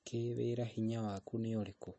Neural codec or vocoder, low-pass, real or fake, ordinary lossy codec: none; 9.9 kHz; real; MP3, 32 kbps